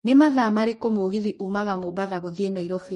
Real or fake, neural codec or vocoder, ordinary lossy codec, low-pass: fake; codec, 44.1 kHz, 2.6 kbps, DAC; MP3, 48 kbps; 14.4 kHz